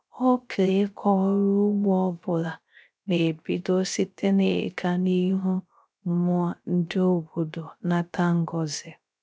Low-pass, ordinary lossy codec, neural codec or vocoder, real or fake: none; none; codec, 16 kHz, 0.3 kbps, FocalCodec; fake